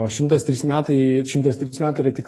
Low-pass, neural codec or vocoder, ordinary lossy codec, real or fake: 14.4 kHz; codec, 44.1 kHz, 2.6 kbps, SNAC; AAC, 48 kbps; fake